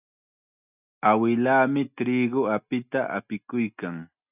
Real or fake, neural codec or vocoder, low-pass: real; none; 3.6 kHz